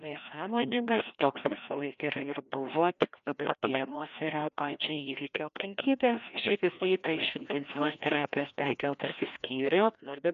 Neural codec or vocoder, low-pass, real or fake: codec, 16 kHz, 1 kbps, FreqCodec, larger model; 7.2 kHz; fake